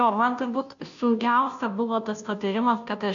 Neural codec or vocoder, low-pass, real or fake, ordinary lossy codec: codec, 16 kHz, 0.5 kbps, FunCodec, trained on Chinese and English, 25 frames a second; 7.2 kHz; fake; AAC, 64 kbps